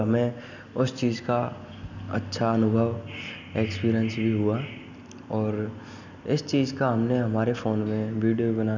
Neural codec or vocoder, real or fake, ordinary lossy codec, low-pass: none; real; none; 7.2 kHz